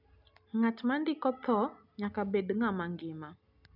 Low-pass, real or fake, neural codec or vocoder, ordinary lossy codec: 5.4 kHz; real; none; none